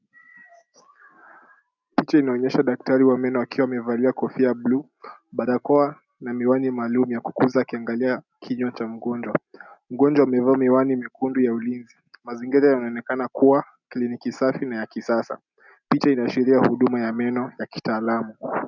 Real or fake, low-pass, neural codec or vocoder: real; 7.2 kHz; none